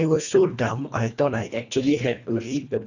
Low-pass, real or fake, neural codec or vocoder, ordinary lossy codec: 7.2 kHz; fake; codec, 24 kHz, 1.5 kbps, HILCodec; none